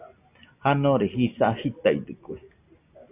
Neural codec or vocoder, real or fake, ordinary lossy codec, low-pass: none; real; AAC, 32 kbps; 3.6 kHz